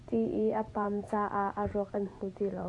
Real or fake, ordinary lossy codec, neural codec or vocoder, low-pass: fake; AAC, 48 kbps; vocoder, 24 kHz, 100 mel bands, Vocos; 10.8 kHz